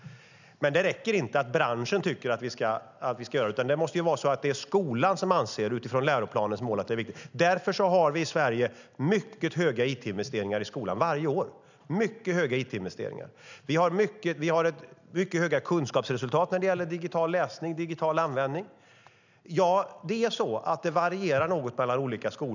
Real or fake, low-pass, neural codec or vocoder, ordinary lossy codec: real; 7.2 kHz; none; none